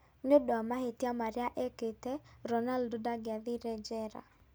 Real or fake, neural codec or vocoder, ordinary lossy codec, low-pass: real; none; none; none